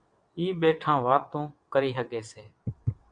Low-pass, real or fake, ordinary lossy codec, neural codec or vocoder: 9.9 kHz; fake; MP3, 64 kbps; vocoder, 22.05 kHz, 80 mel bands, WaveNeXt